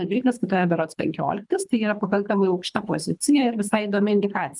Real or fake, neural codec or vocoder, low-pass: fake; codec, 24 kHz, 3 kbps, HILCodec; 10.8 kHz